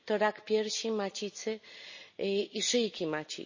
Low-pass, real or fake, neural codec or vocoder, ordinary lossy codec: 7.2 kHz; real; none; MP3, 32 kbps